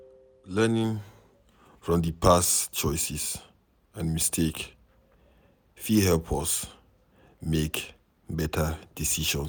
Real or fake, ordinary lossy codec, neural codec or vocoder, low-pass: real; none; none; none